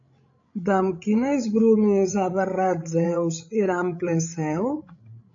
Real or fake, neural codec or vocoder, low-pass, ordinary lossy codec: fake; codec, 16 kHz, 16 kbps, FreqCodec, larger model; 7.2 kHz; AAC, 48 kbps